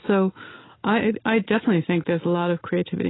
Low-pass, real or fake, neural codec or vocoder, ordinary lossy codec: 7.2 kHz; real; none; AAC, 16 kbps